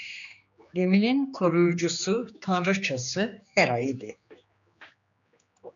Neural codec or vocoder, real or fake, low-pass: codec, 16 kHz, 2 kbps, X-Codec, HuBERT features, trained on general audio; fake; 7.2 kHz